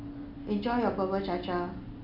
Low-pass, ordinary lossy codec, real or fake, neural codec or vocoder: 5.4 kHz; none; real; none